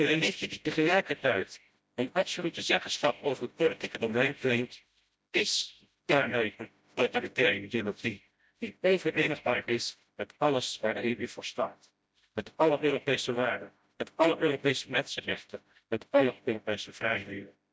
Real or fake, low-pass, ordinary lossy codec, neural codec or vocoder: fake; none; none; codec, 16 kHz, 0.5 kbps, FreqCodec, smaller model